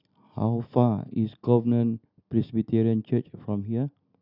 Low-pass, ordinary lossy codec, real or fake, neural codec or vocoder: 5.4 kHz; none; real; none